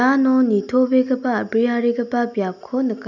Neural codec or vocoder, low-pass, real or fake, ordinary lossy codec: none; 7.2 kHz; real; none